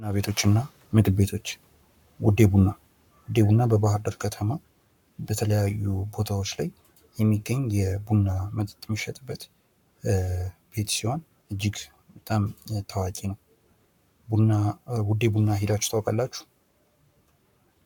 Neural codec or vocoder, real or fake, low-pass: codec, 44.1 kHz, 7.8 kbps, Pupu-Codec; fake; 19.8 kHz